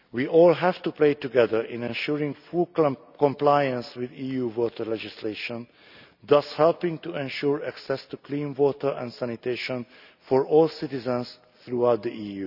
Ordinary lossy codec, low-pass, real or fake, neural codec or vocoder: none; 5.4 kHz; real; none